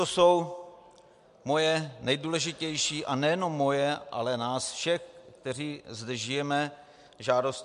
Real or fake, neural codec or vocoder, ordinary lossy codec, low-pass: real; none; MP3, 64 kbps; 10.8 kHz